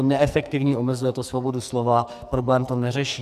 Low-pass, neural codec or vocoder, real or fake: 14.4 kHz; codec, 44.1 kHz, 2.6 kbps, SNAC; fake